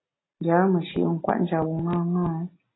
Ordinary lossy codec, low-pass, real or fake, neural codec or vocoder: AAC, 16 kbps; 7.2 kHz; real; none